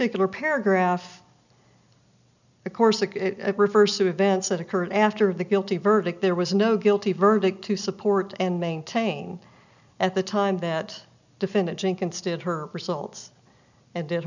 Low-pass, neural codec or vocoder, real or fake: 7.2 kHz; none; real